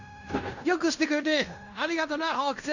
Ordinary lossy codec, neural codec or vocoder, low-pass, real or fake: none; codec, 16 kHz in and 24 kHz out, 0.9 kbps, LongCat-Audio-Codec, fine tuned four codebook decoder; 7.2 kHz; fake